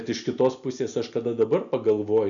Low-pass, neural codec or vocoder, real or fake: 7.2 kHz; none; real